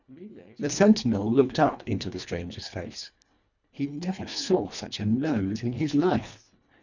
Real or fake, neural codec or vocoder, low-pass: fake; codec, 24 kHz, 1.5 kbps, HILCodec; 7.2 kHz